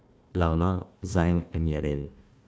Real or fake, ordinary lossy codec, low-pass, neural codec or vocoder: fake; none; none; codec, 16 kHz, 1 kbps, FunCodec, trained on Chinese and English, 50 frames a second